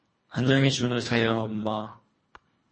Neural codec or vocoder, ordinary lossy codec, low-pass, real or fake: codec, 24 kHz, 1.5 kbps, HILCodec; MP3, 32 kbps; 9.9 kHz; fake